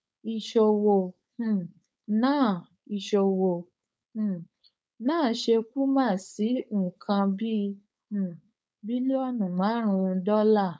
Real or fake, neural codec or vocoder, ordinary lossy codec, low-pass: fake; codec, 16 kHz, 4.8 kbps, FACodec; none; none